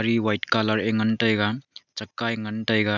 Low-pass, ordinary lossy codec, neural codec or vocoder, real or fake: 7.2 kHz; none; none; real